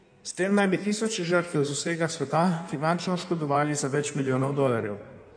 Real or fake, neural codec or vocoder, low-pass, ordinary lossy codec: fake; codec, 16 kHz in and 24 kHz out, 1.1 kbps, FireRedTTS-2 codec; 9.9 kHz; none